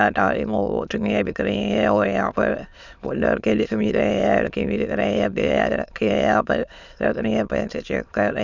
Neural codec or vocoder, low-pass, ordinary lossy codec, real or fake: autoencoder, 22.05 kHz, a latent of 192 numbers a frame, VITS, trained on many speakers; 7.2 kHz; Opus, 64 kbps; fake